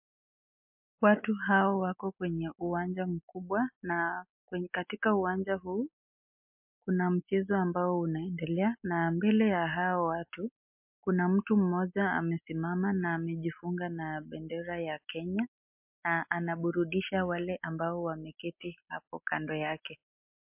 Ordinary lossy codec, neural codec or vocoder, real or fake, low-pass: MP3, 32 kbps; none; real; 3.6 kHz